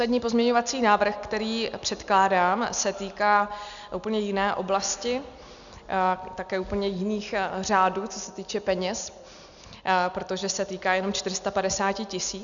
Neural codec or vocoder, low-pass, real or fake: none; 7.2 kHz; real